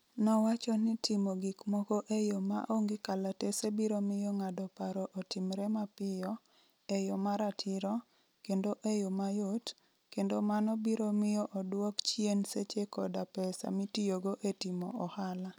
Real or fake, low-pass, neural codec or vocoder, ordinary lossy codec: real; none; none; none